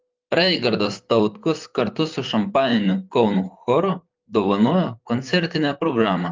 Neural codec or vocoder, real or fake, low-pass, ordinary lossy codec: vocoder, 44.1 kHz, 128 mel bands, Pupu-Vocoder; fake; 7.2 kHz; Opus, 32 kbps